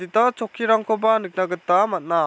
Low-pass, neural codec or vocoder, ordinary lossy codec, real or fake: none; none; none; real